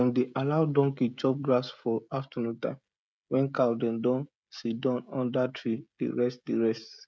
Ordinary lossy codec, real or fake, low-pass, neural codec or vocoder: none; fake; none; codec, 16 kHz, 16 kbps, FreqCodec, smaller model